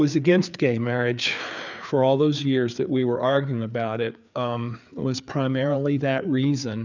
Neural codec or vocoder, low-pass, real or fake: codec, 16 kHz, 4 kbps, FreqCodec, larger model; 7.2 kHz; fake